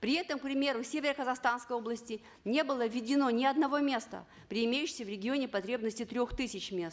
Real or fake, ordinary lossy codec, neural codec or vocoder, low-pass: real; none; none; none